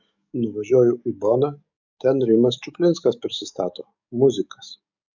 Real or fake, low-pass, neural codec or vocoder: fake; 7.2 kHz; codec, 44.1 kHz, 7.8 kbps, DAC